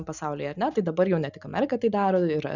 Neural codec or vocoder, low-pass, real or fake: none; 7.2 kHz; real